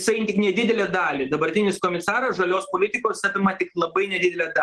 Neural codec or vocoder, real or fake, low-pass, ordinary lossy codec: none; real; 10.8 kHz; Opus, 16 kbps